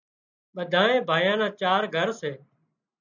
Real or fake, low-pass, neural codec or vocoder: real; 7.2 kHz; none